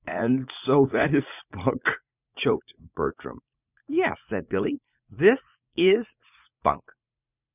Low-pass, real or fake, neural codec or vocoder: 3.6 kHz; fake; vocoder, 44.1 kHz, 80 mel bands, Vocos